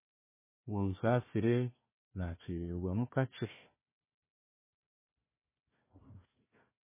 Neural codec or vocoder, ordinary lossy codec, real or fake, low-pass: codec, 16 kHz, 1 kbps, FunCodec, trained on Chinese and English, 50 frames a second; MP3, 16 kbps; fake; 3.6 kHz